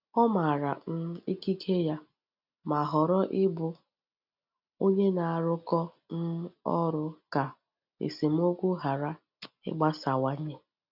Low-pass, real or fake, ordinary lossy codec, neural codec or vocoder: 5.4 kHz; real; none; none